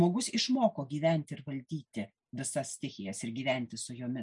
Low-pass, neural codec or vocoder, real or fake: 10.8 kHz; none; real